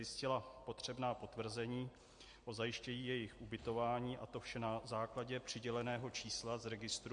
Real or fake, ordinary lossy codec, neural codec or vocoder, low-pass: real; MP3, 48 kbps; none; 9.9 kHz